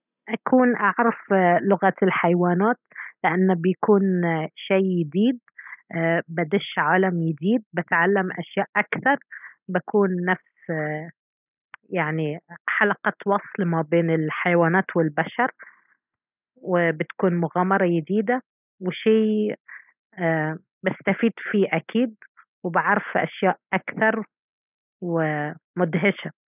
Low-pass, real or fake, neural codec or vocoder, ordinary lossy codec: 3.6 kHz; real; none; none